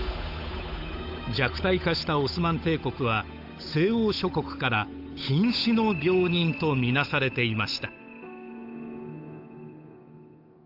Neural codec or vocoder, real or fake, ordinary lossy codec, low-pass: codec, 16 kHz, 8 kbps, FunCodec, trained on Chinese and English, 25 frames a second; fake; AAC, 48 kbps; 5.4 kHz